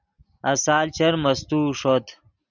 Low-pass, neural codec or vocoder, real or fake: 7.2 kHz; none; real